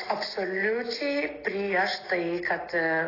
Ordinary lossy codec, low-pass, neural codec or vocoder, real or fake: AAC, 24 kbps; 5.4 kHz; none; real